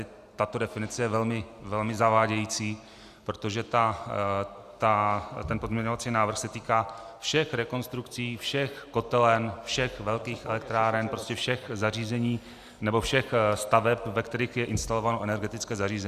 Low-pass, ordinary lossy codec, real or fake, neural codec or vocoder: 14.4 kHz; Opus, 64 kbps; real; none